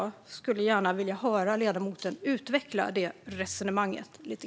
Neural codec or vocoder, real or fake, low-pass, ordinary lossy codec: none; real; none; none